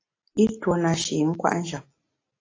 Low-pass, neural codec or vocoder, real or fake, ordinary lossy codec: 7.2 kHz; none; real; AAC, 32 kbps